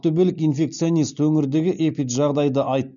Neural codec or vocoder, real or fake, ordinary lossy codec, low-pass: none; real; none; 7.2 kHz